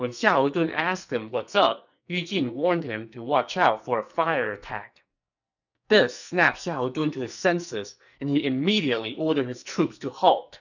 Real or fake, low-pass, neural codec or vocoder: fake; 7.2 kHz; codec, 44.1 kHz, 2.6 kbps, SNAC